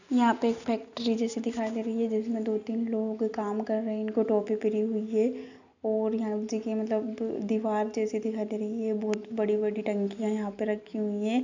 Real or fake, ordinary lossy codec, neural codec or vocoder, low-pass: real; none; none; 7.2 kHz